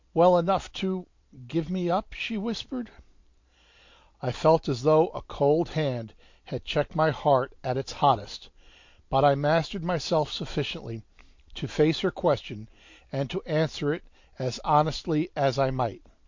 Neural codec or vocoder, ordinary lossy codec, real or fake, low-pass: vocoder, 44.1 kHz, 128 mel bands every 512 samples, BigVGAN v2; MP3, 48 kbps; fake; 7.2 kHz